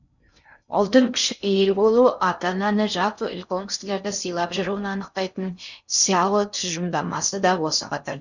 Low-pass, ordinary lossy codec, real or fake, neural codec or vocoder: 7.2 kHz; none; fake; codec, 16 kHz in and 24 kHz out, 0.6 kbps, FocalCodec, streaming, 4096 codes